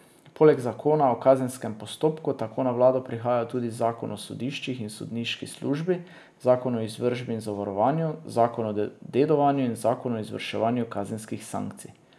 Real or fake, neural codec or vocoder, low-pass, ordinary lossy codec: real; none; none; none